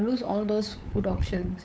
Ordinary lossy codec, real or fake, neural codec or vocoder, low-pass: none; fake; codec, 16 kHz, 8 kbps, FunCodec, trained on LibriTTS, 25 frames a second; none